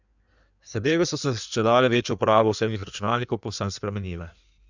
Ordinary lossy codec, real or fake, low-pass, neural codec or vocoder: none; fake; 7.2 kHz; codec, 16 kHz in and 24 kHz out, 1.1 kbps, FireRedTTS-2 codec